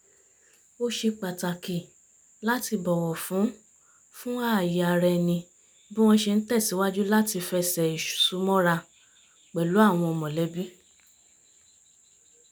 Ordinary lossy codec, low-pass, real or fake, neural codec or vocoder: none; none; real; none